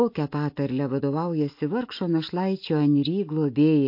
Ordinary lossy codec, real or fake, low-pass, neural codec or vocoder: MP3, 32 kbps; real; 5.4 kHz; none